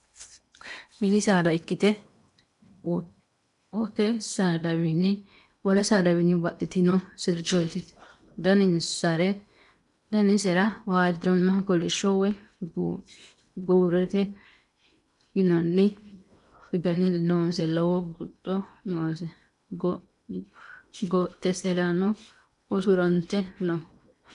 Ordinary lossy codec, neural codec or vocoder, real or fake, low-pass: MP3, 96 kbps; codec, 16 kHz in and 24 kHz out, 0.8 kbps, FocalCodec, streaming, 65536 codes; fake; 10.8 kHz